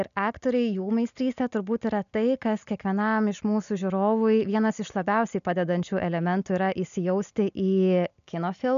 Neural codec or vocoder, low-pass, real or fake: none; 7.2 kHz; real